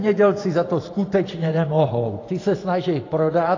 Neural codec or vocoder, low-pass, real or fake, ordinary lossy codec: vocoder, 44.1 kHz, 128 mel bands every 512 samples, BigVGAN v2; 7.2 kHz; fake; AAC, 32 kbps